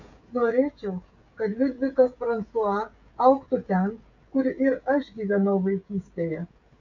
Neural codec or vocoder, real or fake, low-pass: codec, 16 kHz, 16 kbps, FreqCodec, smaller model; fake; 7.2 kHz